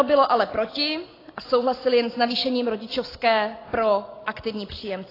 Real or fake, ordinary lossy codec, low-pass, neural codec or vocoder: real; AAC, 24 kbps; 5.4 kHz; none